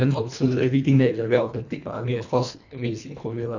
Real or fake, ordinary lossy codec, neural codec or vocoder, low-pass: fake; none; codec, 24 kHz, 1.5 kbps, HILCodec; 7.2 kHz